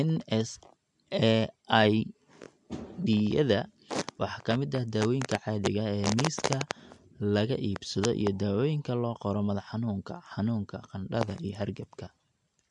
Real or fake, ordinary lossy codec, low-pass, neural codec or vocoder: real; MP3, 64 kbps; 10.8 kHz; none